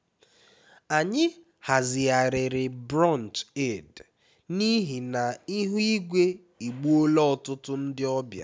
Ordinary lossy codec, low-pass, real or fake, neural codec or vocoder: none; none; real; none